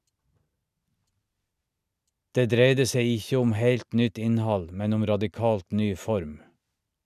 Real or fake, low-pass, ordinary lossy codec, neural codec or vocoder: real; 14.4 kHz; none; none